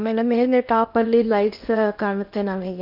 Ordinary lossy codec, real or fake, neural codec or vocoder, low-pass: none; fake; codec, 16 kHz in and 24 kHz out, 0.8 kbps, FocalCodec, streaming, 65536 codes; 5.4 kHz